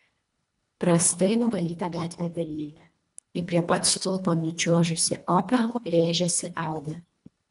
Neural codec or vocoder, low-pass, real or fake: codec, 24 kHz, 1.5 kbps, HILCodec; 10.8 kHz; fake